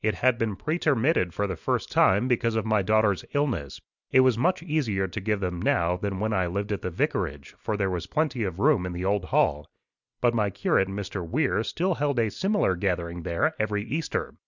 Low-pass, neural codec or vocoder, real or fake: 7.2 kHz; none; real